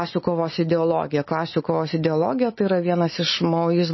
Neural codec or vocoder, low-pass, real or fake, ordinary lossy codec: none; 7.2 kHz; real; MP3, 24 kbps